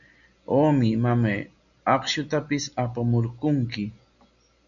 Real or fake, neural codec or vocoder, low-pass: real; none; 7.2 kHz